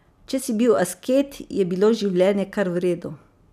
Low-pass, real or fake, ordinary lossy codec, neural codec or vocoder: 14.4 kHz; real; none; none